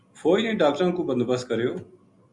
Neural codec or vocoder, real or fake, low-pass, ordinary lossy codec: none; real; 10.8 kHz; Opus, 64 kbps